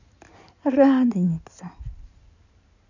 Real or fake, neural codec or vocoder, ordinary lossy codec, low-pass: fake; codec, 16 kHz in and 24 kHz out, 2.2 kbps, FireRedTTS-2 codec; none; 7.2 kHz